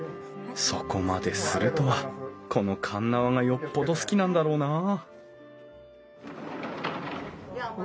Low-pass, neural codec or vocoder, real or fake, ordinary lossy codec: none; none; real; none